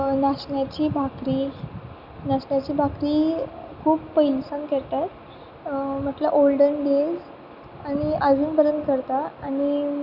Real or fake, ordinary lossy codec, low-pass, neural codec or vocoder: real; none; 5.4 kHz; none